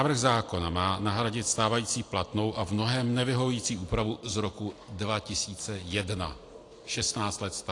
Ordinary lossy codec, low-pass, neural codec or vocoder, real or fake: AAC, 48 kbps; 10.8 kHz; none; real